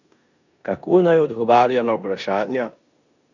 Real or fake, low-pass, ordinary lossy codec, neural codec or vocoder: fake; 7.2 kHz; none; codec, 16 kHz in and 24 kHz out, 0.9 kbps, LongCat-Audio-Codec, four codebook decoder